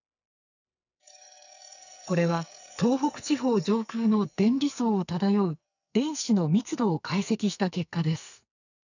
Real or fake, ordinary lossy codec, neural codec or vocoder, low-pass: fake; none; codec, 44.1 kHz, 2.6 kbps, SNAC; 7.2 kHz